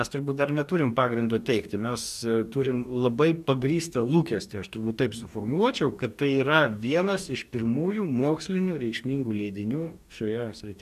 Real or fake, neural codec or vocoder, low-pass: fake; codec, 44.1 kHz, 2.6 kbps, DAC; 14.4 kHz